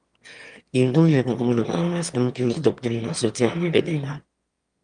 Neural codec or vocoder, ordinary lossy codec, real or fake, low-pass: autoencoder, 22.05 kHz, a latent of 192 numbers a frame, VITS, trained on one speaker; Opus, 32 kbps; fake; 9.9 kHz